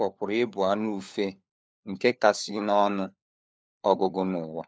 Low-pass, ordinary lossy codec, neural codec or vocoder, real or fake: none; none; codec, 16 kHz, 4 kbps, FunCodec, trained on LibriTTS, 50 frames a second; fake